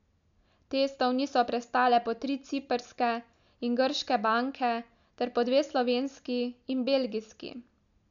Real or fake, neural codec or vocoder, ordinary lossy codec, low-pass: real; none; none; 7.2 kHz